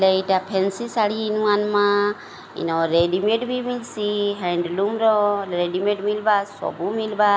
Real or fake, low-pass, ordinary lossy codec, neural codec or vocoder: real; none; none; none